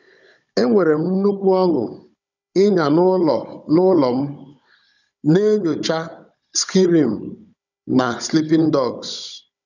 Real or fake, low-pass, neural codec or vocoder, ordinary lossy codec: fake; 7.2 kHz; codec, 16 kHz, 16 kbps, FunCodec, trained on Chinese and English, 50 frames a second; none